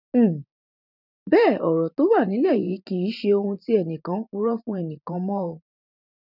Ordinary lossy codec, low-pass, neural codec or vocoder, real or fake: none; 5.4 kHz; vocoder, 44.1 kHz, 128 mel bands every 512 samples, BigVGAN v2; fake